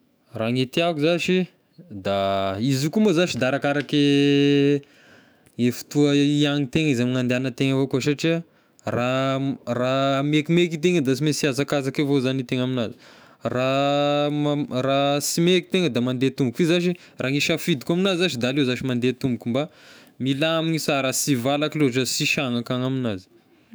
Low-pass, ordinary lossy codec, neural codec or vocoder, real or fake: none; none; autoencoder, 48 kHz, 128 numbers a frame, DAC-VAE, trained on Japanese speech; fake